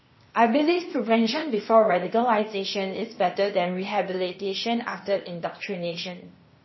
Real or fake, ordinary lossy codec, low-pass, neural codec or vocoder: fake; MP3, 24 kbps; 7.2 kHz; codec, 16 kHz, 0.8 kbps, ZipCodec